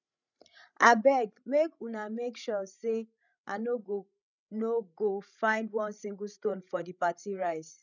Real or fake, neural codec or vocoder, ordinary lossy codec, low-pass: fake; codec, 16 kHz, 16 kbps, FreqCodec, larger model; none; 7.2 kHz